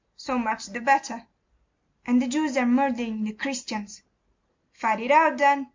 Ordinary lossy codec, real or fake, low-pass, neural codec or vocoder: MP3, 48 kbps; real; 7.2 kHz; none